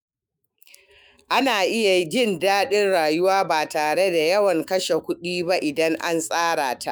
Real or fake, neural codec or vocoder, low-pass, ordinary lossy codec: fake; autoencoder, 48 kHz, 128 numbers a frame, DAC-VAE, trained on Japanese speech; none; none